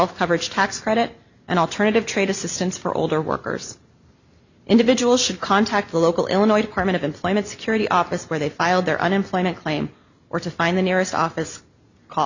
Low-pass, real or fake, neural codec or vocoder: 7.2 kHz; real; none